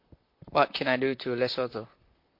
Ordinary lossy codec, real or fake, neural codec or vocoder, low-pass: MP3, 32 kbps; fake; vocoder, 44.1 kHz, 128 mel bands, Pupu-Vocoder; 5.4 kHz